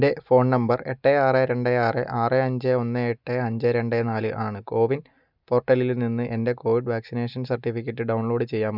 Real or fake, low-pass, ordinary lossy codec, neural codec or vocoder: real; 5.4 kHz; none; none